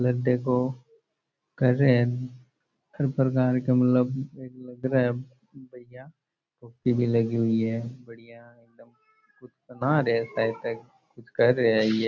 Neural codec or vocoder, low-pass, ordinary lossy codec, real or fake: none; 7.2 kHz; none; real